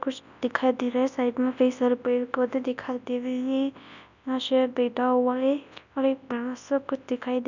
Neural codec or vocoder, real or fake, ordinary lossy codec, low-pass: codec, 24 kHz, 0.9 kbps, WavTokenizer, large speech release; fake; none; 7.2 kHz